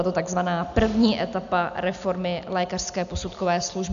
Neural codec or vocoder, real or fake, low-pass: none; real; 7.2 kHz